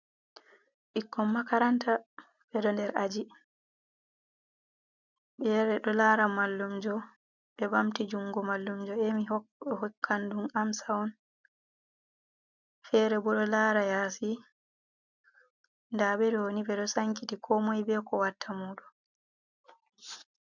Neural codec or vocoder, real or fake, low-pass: none; real; 7.2 kHz